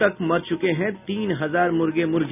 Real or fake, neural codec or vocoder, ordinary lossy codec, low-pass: real; none; none; 3.6 kHz